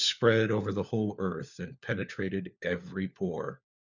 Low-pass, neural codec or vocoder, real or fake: 7.2 kHz; codec, 16 kHz, 4 kbps, FunCodec, trained on LibriTTS, 50 frames a second; fake